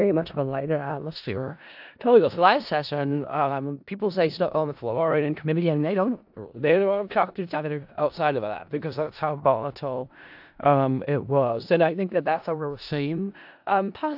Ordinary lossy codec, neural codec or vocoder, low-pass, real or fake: AAC, 48 kbps; codec, 16 kHz in and 24 kHz out, 0.4 kbps, LongCat-Audio-Codec, four codebook decoder; 5.4 kHz; fake